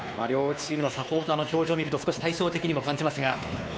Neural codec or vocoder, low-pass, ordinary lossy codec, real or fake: codec, 16 kHz, 2 kbps, X-Codec, WavLM features, trained on Multilingual LibriSpeech; none; none; fake